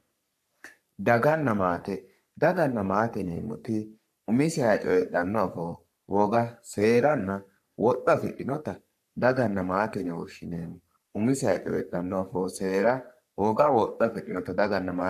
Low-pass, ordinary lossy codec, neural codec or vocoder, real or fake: 14.4 kHz; AAC, 96 kbps; codec, 44.1 kHz, 3.4 kbps, Pupu-Codec; fake